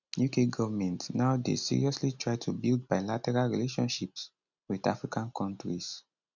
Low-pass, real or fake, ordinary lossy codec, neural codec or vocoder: 7.2 kHz; real; none; none